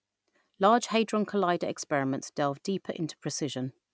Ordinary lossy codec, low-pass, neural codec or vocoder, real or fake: none; none; none; real